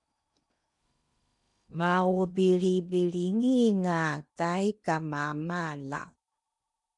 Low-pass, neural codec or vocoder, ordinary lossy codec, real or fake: 10.8 kHz; codec, 16 kHz in and 24 kHz out, 0.8 kbps, FocalCodec, streaming, 65536 codes; MP3, 96 kbps; fake